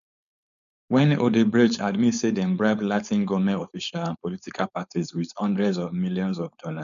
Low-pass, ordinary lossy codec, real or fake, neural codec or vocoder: 7.2 kHz; none; fake; codec, 16 kHz, 4.8 kbps, FACodec